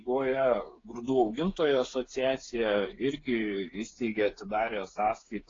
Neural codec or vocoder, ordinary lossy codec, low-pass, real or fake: codec, 16 kHz, 8 kbps, FreqCodec, smaller model; AAC, 32 kbps; 7.2 kHz; fake